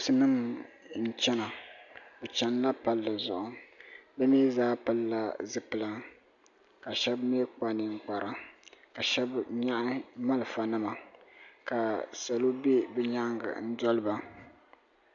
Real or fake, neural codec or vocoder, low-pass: real; none; 7.2 kHz